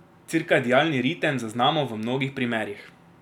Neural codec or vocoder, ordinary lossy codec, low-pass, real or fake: none; none; 19.8 kHz; real